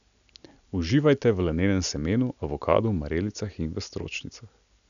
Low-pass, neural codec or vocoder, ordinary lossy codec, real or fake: 7.2 kHz; none; none; real